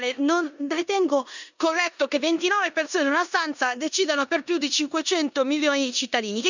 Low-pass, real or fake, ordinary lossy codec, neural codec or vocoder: 7.2 kHz; fake; none; codec, 16 kHz in and 24 kHz out, 0.9 kbps, LongCat-Audio-Codec, four codebook decoder